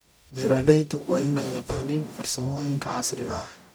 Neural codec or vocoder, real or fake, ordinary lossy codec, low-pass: codec, 44.1 kHz, 0.9 kbps, DAC; fake; none; none